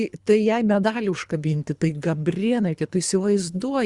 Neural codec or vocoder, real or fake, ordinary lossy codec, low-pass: codec, 24 kHz, 3 kbps, HILCodec; fake; Opus, 64 kbps; 10.8 kHz